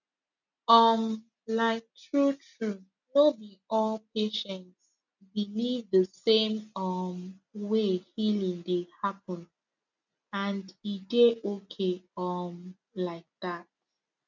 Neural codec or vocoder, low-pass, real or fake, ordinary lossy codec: none; 7.2 kHz; real; none